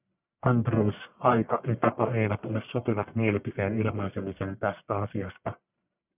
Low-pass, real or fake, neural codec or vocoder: 3.6 kHz; fake; codec, 44.1 kHz, 1.7 kbps, Pupu-Codec